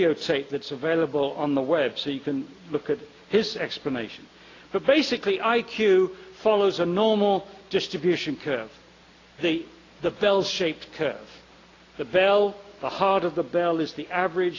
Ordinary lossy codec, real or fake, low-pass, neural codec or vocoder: AAC, 32 kbps; real; 7.2 kHz; none